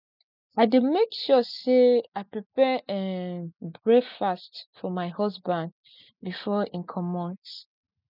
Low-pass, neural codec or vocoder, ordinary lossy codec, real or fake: 5.4 kHz; none; none; real